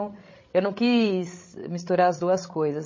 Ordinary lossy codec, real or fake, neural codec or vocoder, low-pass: MP3, 32 kbps; fake; codec, 16 kHz, 16 kbps, FreqCodec, larger model; 7.2 kHz